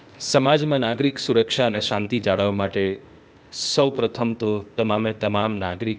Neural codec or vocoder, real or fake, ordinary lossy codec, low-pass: codec, 16 kHz, 0.8 kbps, ZipCodec; fake; none; none